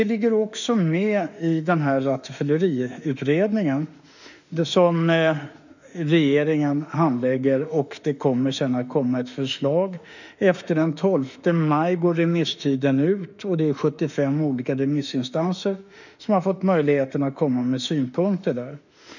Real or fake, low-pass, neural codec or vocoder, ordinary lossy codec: fake; 7.2 kHz; autoencoder, 48 kHz, 32 numbers a frame, DAC-VAE, trained on Japanese speech; none